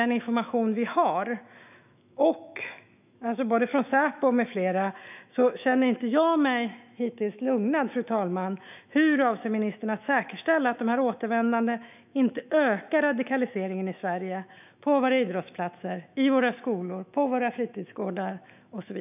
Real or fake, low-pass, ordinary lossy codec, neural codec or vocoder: fake; 3.6 kHz; none; autoencoder, 48 kHz, 128 numbers a frame, DAC-VAE, trained on Japanese speech